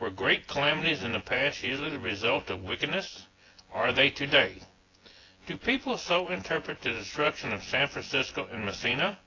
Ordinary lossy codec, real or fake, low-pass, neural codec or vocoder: AAC, 32 kbps; fake; 7.2 kHz; vocoder, 24 kHz, 100 mel bands, Vocos